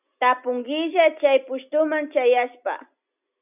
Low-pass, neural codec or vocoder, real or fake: 3.6 kHz; none; real